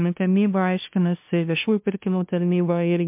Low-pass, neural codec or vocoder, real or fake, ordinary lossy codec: 3.6 kHz; codec, 16 kHz, 0.5 kbps, FunCodec, trained on LibriTTS, 25 frames a second; fake; MP3, 32 kbps